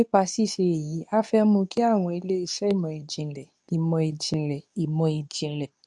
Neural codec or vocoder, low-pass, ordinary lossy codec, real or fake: codec, 24 kHz, 0.9 kbps, WavTokenizer, medium speech release version 2; 10.8 kHz; none; fake